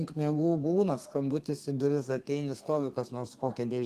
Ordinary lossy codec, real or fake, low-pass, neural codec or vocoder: Opus, 24 kbps; fake; 14.4 kHz; codec, 32 kHz, 1.9 kbps, SNAC